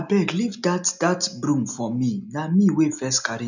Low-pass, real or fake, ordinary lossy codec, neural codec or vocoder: 7.2 kHz; real; none; none